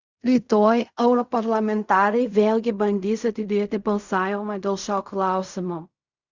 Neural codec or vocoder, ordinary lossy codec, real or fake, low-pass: codec, 16 kHz in and 24 kHz out, 0.4 kbps, LongCat-Audio-Codec, fine tuned four codebook decoder; Opus, 64 kbps; fake; 7.2 kHz